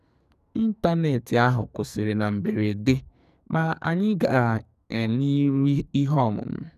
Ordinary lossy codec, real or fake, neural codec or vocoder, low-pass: none; fake; codec, 44.1 kHz, 2.6 kbps, SNAC; 14.4 kHz